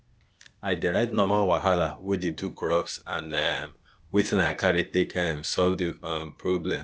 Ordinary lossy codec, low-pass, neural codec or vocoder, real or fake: none; none; codec, 16 kHz, 0.8 kbps, ZipCodec; fake